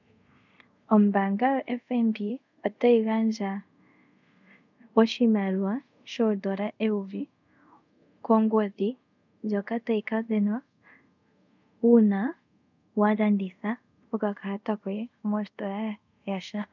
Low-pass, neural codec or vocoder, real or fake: 7.2 kHz; codec, 24 kHz, 0.5 kbps, DualCodec; fake